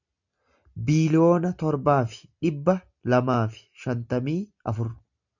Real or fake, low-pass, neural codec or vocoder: real; 7.2 kHz; none